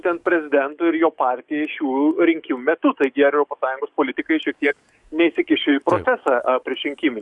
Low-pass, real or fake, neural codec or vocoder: 10.8 kHz; fake; codec, 44.1 kHz, 7.8 kbps, DAC